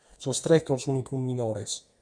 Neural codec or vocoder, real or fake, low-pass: codec, 32 kHz, 1.9 kbps, SNAC; fake; 9.9 kHz